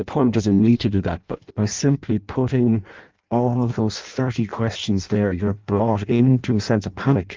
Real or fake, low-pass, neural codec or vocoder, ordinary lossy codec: fake; 7.2 kHz; codec, 16 kHz in and 24 kHz out, 0.6 kbps, FireRedTTS-2 codec; Opus, 32 kbps